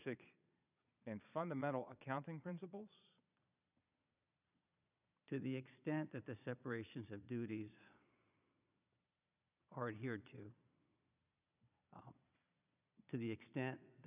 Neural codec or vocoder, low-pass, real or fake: vocoder, 22.05 kHz, 80 mel bands, Vocos; 3.6 kHz; fake